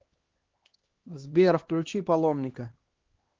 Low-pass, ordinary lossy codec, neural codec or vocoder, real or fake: 7.2 kHz; Opus, 16 kbps; codec, 16 kHz, 2 kbps, X-Codec, HuBERT features, trained on LibriSpeech; fake